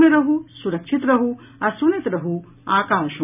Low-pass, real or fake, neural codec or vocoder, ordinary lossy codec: 3.6 kHz; real; none; none